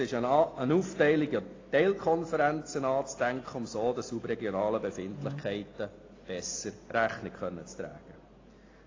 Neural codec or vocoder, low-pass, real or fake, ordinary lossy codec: none; 7.2 kHz; real; AAC, 32 kbps